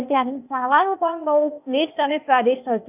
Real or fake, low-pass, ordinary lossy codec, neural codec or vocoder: fake; 3.6 kHz; none; codec, 16 kHz, 0.8 kbps, ZipCodec